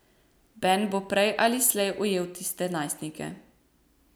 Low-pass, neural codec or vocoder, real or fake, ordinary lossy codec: none; none; real; none